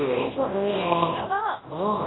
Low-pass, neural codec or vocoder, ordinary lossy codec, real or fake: 7.2 kHz; codec, 24 kHz, 0.9 kbps, WavTokenizer, large speech release; AAC, 16 kbps; fake